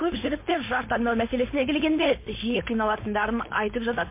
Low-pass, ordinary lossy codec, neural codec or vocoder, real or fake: 3.6 kHz; MP3, 24 kbps; codec, 16 kHz, 4.8 kbps, FACodec; fake